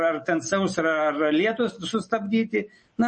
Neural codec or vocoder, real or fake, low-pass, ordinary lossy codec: none; real; 10.8 kHz; MP3, 32 kbps